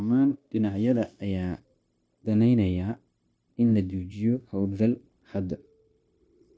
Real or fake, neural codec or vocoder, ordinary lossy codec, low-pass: fake; codec, 16 kHz, 0.9 kbps, LongCat-Audio-Codec; none; none